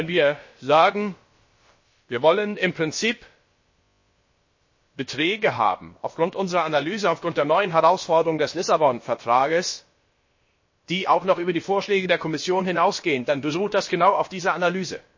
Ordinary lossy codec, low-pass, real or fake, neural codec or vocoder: MP3, 32 kbps; 7.2 kHz; fake; codec, 16 kHz, about 1 kbps, DyCAST, with the encoder's durations